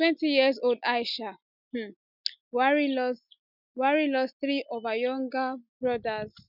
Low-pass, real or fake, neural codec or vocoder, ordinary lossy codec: 5.4 kHz; real; none; none